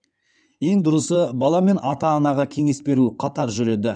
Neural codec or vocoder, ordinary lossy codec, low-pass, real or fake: codec, 16 kHz in and 24 kHz out, 2.2 kbps, FireRedTTS-2 codec; none; 9.9 kHz; fake